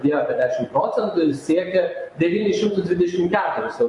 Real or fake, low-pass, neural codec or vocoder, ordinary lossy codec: fake; 10.8 kHz; vocoder, 24 kHz, 100 mel bands, Vocos; MP3, 48 kbps